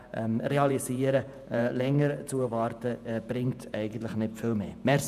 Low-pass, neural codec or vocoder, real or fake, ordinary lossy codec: 14.4 kHz; vocoder, 48 kHz, 128 mel bands, Vocos; fake; none